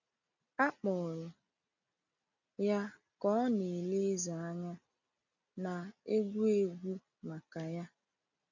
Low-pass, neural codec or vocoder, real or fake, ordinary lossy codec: 7.2 kHz; none; real; none